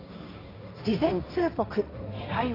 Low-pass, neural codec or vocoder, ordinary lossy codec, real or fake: 5.4 kHz; codec, 16 kHz, 1.1 kbps, Voila-Tokenizer; AAC, 24 kbps; fake